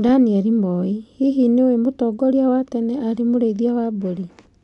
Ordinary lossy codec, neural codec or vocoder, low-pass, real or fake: none; none; 10.8 kHz; real